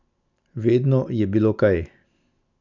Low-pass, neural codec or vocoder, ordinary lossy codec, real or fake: 7.2 kHz; none; none; real